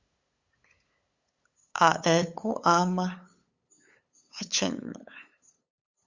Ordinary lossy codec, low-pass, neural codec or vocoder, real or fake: Opus, 64 kbps; 7.2 kHz; codec, 16 kHz, 8 kbps, FunCodec, trained on LibriTTS, 25 frames a second; fake